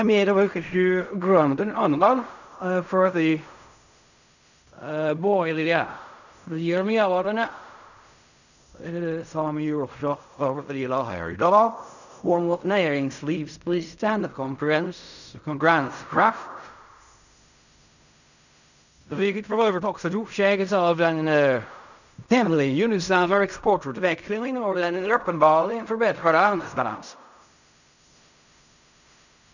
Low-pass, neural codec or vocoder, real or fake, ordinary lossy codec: 7.2 kHz; codec, 16 kHz in and 24 kHz out, 0.4 kbps, LongCat-Audio-Codec, fine tuned four codebook decoder; fake; none